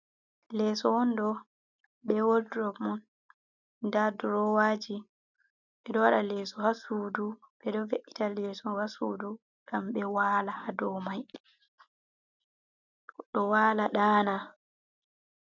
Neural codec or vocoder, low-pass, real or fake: none; 7.2 kHz; real